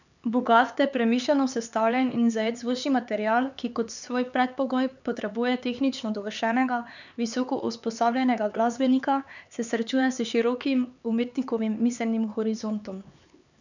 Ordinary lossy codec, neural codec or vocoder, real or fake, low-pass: none; codec, 16 kHz, 4 kbps, X-Codec, HuBERT features, trained on LibriSpeech; fake; 7.2 kHz